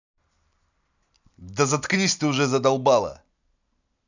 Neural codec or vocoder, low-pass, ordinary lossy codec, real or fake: none; 7.2 kHz; none; real